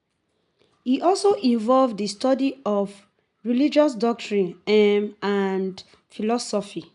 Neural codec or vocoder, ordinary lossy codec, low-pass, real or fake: none; none; 10.8 kHz; real